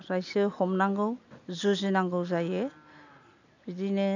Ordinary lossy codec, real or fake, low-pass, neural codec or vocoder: none; real; 7.2 kHz; none